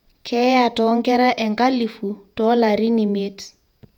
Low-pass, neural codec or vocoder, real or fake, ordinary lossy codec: 19.8 kHz; vocoder, 48 kHz, 128 mel bands, Vocos; fake; none